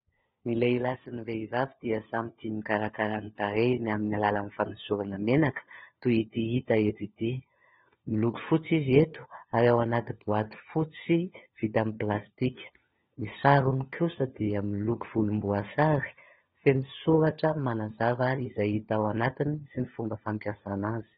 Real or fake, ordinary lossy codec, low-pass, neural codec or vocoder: fake; AAC, 16 kbps; 7.2 kHz; codec, 16 kHz, 4 kbps, FunCodec, trained on LibriTTS, 50 frames a second